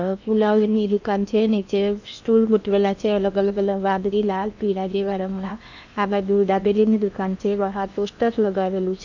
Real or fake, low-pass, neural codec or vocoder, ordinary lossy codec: fake; 7.2 kHz; codec, 16 kHz in and 24 kHz out, 0.8 kbps, FocalCodec, streaming, 65536 codes; none